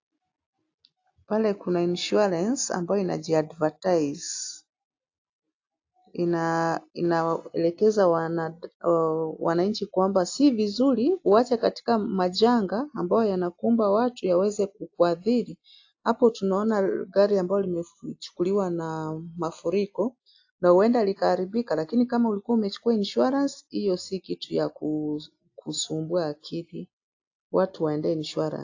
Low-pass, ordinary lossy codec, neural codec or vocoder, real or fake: 7.2 kHz; AAC, 48 kbps; none; real